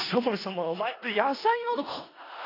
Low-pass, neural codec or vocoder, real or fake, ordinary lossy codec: 5.4 kHz; codec, 16 kHz in and 24 kHz out, 0.4 kbps, LongCat-Audio-Codec, four codebook decoder; fake; AAC, 24 kbps